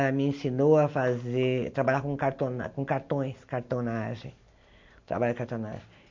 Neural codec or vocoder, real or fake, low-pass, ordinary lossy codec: none; real; 7.2 kHz; MP3, 48 kbps